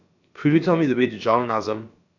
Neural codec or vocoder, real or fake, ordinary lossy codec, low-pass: codec, 16 kHz, about 1 kbps, DyCAST, with the encoder's durations; fake; none; 7.2 kHz